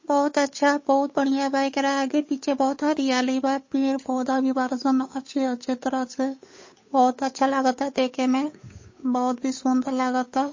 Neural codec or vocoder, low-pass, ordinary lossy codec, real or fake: codec, 16 kHz, 8 kbps, FunCodec, trained on Chinese and English, 25 frames a second; 7.2 kHz; MP3, 32 kbps; fake